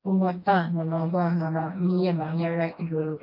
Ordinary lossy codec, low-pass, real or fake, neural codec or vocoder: none; 5.4 kHz; fake; codec, 16 kHz, 1 kbps, FreqCodec, smaller model